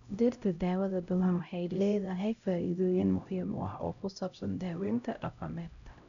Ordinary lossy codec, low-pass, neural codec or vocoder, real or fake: none; 7.2 kHz; codec, 16 kHz, 0.5 kbps, X-Codec, HuBERT features, trained on LibriSpeech; fake